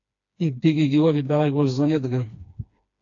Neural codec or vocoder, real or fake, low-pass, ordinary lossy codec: codec, 16 kHz, 2 kbps, FreqCodec, smaller model; fake; 7.2 kHz; AAC, 48 kbps